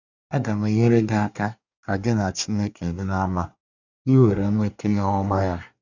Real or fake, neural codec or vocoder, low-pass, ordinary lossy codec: fake; codec, 24 kHz, 1 kbps, SNAC; 7.2 kHz; none